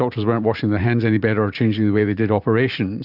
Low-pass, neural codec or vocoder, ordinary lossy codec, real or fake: 5.4 kHz; vocoder, 44.1 kHz, 128 mel bands every 512 samples, BigVGAN v2; Opus, 64 kbps; fake